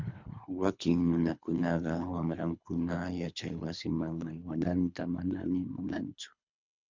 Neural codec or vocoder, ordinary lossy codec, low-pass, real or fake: codec, 24 kHz, 3 kbps, HILCodec; MP3, 64 kbps; 7.2 kHz; fake